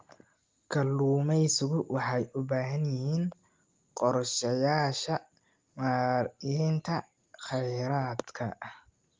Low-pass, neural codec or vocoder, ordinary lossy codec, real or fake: 7.2 kHz; none; Opus, 32 kbps; real